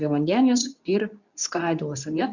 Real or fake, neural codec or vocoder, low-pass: fake; codec, 24 kHz, 0.9 kbps, WavTokenizer, medium speech release version 2; 7.2 kHz